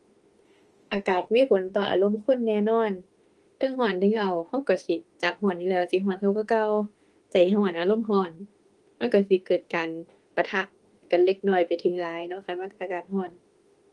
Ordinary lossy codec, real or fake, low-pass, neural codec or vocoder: Opus, 24 kbps; fake; 10.8 kHz; autoencoder, 48 kHz, 32 numbers a frame, DAC-VAE, trained on Japanese speech